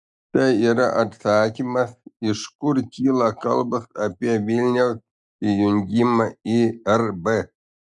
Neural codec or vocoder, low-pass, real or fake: none; 10.8 kHz; real